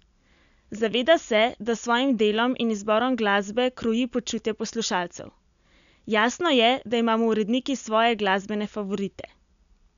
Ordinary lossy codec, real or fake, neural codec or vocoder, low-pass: none; real; none; 7.2 kHz